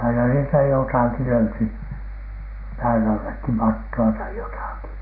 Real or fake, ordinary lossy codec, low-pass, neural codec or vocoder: real; none; 5.4 kHz; none